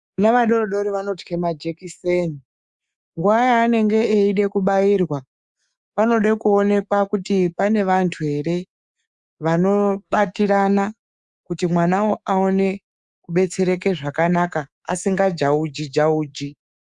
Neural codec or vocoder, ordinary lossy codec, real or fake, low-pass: codec, 24 kHz, 3.1 kbps, DualCodec; Opus, 64 kbps; fake; 10.8 kHz